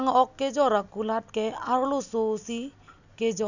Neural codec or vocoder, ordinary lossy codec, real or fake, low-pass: none; none; real; 7.2 kHz